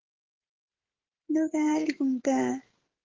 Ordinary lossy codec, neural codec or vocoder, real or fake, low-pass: Opus, 16 kbps; codec, 16 kHz, 16 kbps, FreqCodec, smaller model; fake; 7.2 kHz